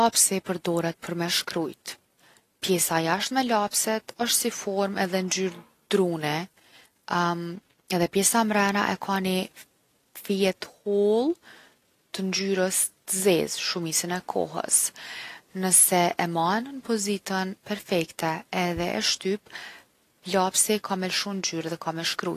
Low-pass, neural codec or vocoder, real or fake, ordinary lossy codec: 14.4 kHz; none; real; AAC, 48 kbps